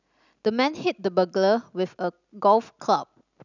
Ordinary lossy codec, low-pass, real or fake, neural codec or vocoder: none; 7.2 kHz; real; none